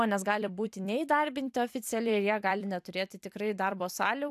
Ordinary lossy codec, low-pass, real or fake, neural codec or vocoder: AAC, 96 kbps; 14.4 kHz; fake; vocoder, 44.1 kHz, 128 mel bands every 256 samples, BigVGAN v2